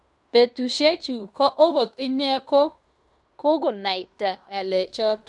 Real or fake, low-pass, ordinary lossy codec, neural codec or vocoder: fake; 10.8 kHz; none; codec, 16 kHz in and 24 kHz out, 0.9 kbps, LongCat-Audio-Codec, fine tuned four codebook decoder